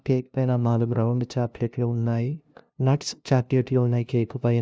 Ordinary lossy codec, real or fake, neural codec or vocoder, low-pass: none; fake; codec, 16 kHz, 0.5 kbps, FunCodec, trained on LibriTTS, 25 frames a second; none